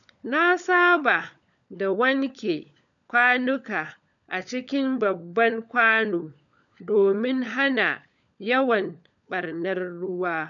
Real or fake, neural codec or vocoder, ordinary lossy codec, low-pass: fake; codec, 16 kHz, 16 kbps, FunCodec, trained on LibriTTS, 50 frames a second; none; 7.2 kHz